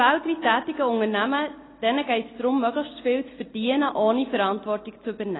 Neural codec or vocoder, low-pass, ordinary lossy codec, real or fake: none; 7.2 kHz; AAC, 16 kbps; real